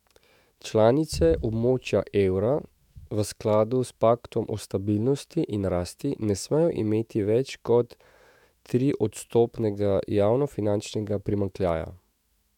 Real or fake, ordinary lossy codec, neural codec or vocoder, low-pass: fake; MP3, 96 kbps; autoencoder, 48 kHz, 128 numbers a frame, DAC-VAE, trained on Japanese speech; 19.8 kHz